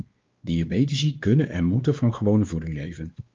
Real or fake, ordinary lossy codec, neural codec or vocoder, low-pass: fake; Opus, 24 kbps; codec, 16 kHz, 2 kbps, X-Codec, WavLM features, trained on Multilingual LibriSpeech; 7.2 kHz